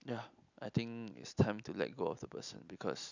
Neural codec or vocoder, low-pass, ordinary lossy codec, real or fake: none; 7.2 kHz; none; real